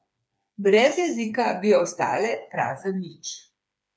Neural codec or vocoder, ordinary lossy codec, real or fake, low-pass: codec, 16 kHz, 4 kbps, FreqCodec, smaller model; none; fake; none